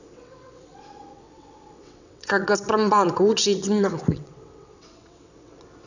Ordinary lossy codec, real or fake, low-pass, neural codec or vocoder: none; fake; 7.2 kHz; vocoder, 22.05 kHz, 80 mel bands, WaveNeXt